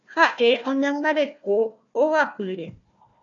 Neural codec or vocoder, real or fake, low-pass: codec, 16 kHz, 1 kbps, FunCodec, trained on Chinese and English, 50 frames a second; fake; 7.2 kHz